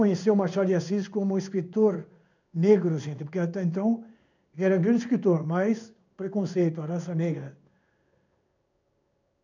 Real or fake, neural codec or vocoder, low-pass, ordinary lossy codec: fake; codec, 16 kHz in and 24 kHz out, 1 kbps, XY-Tokenizer; 7.2 kHz; none